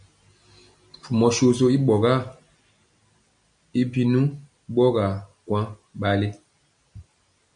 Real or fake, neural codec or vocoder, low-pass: real; none; 9.9 kHz